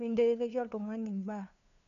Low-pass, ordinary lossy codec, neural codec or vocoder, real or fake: 7.2 kHz; none; codec, 16 kHz, 2 kbps, FunCodec, trained on LibriTTS, 25 frames a second; fake